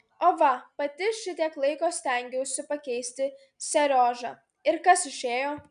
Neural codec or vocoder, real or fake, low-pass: none; real; 9.9 kHz